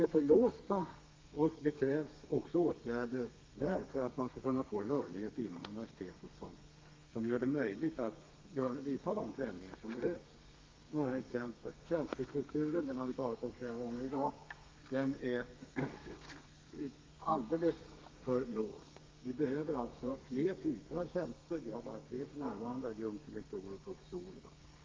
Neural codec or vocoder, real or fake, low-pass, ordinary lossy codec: codec, 32 kHz, 1.9 kbps, SNAC; fake; 7.2 kHz; Opus, 24 kbps